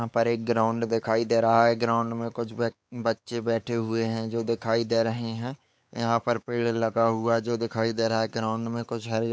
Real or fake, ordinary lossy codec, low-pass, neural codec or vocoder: fake; none; none; codec, 16 kHz, 4 kbps, X-Codec, WavLM features, trained on Multilingual LibriSpeech